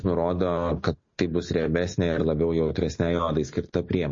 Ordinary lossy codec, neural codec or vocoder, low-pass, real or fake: MP3, 32 kbps; none; 7.2 kHz; real